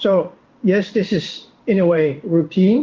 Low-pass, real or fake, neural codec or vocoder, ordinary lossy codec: 7.2 kHz; fake; codec, 16 kHz in and 24 kHz out, 1 kbps, XY-Tokenizer; Opus, 32 kbps